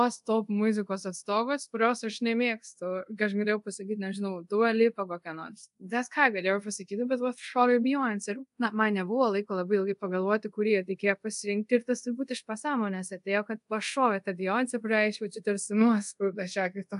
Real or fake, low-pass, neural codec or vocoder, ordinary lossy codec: fake; 10.8 kHz; codec, 24 kHz, 0.5 kbps, DualCodec; AAC, 96 kbps